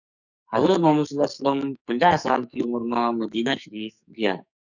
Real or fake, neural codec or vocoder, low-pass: fake; codec, 44.1 kHz, 2.6 kbps, SNAC; 7.2 kHz